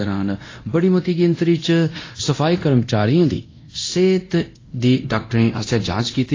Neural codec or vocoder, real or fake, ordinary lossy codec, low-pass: codec, 24 kHz, 0.9 kbps, DualCodec; fake; AAC, 32 kbps; 7.2 kHz